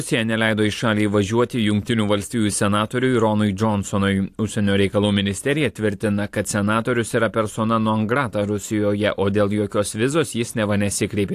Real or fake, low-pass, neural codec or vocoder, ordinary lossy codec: fake; 14.4 kHz; vocoder, 44.1 kHz, 128 mel bands every 512 samples, BigVGAN v2; AAC, 64 kbps